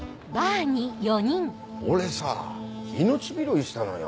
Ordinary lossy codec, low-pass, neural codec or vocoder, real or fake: none; none; none; real